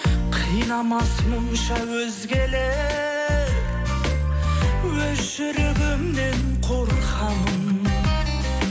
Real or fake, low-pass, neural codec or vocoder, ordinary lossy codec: real; none; none; none